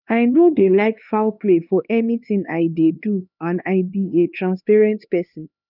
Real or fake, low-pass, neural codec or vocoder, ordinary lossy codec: fake; 5.4 kHz; codec, 16 kHz, 2 kbps, X-Codec, HuBERT features, trained on LibriSpeech; none